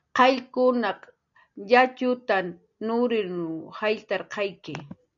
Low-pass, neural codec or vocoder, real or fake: 7.2 kHz; none; real